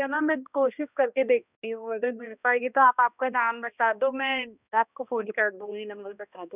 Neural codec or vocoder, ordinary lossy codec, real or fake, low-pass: codec, 16 kHz, 1 kbps, X-Codec, HuBERT features, trained on balanced general audio; none; fake; 3.6 kHz